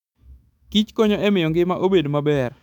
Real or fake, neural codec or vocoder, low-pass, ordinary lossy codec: fake; autoencoder, 48 kHz, 128 numbers a frame, DAC-VAE, trained on Japanese speech; 19.8 kHz; none